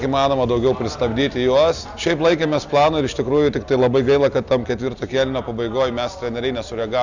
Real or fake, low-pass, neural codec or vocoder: real; 7.2 kHz; none